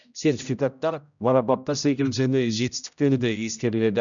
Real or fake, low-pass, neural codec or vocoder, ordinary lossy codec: fake; 7.2 kHz; codec, 16 kHz, 0.5 kbps, X-Codec, HuBERT features, trained on general audio; none